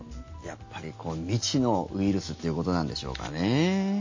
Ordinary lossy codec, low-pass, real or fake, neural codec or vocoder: MP3, 32 kbps; 7.2 kHz; real; none